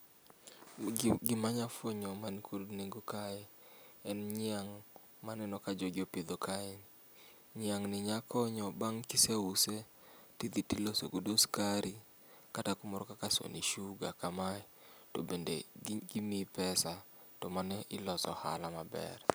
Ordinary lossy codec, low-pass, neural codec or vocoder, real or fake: none; none; none; real